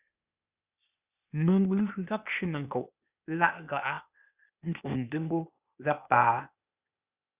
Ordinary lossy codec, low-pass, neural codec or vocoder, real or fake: Opus, 64 kbps; 3.6 kHz; codec, 16 kHz, 0.8 kbps, ZipCodec; fake